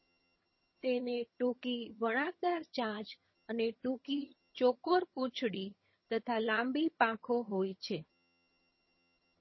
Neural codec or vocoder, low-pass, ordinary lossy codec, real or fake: vocoder, 22.05 kHz, 80 mel bands, HiFi-GAN; 7.2 kHz; MP3, 24 kbps; fake